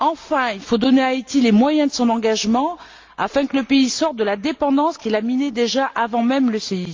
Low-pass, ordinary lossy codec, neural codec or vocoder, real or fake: 7.2 kHz; Opus, 32 kbps; none; real